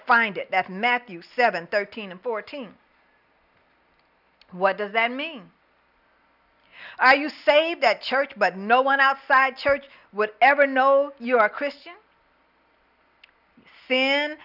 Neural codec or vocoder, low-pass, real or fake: none; 5.4 kHz; real